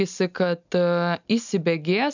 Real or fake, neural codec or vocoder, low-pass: real; none; 7.2 kHz